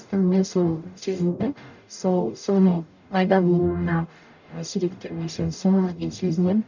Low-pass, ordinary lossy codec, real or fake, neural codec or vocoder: 7.2 kHz; none; fake; codec, 44.1 kHz, 0.9 kbps, DAC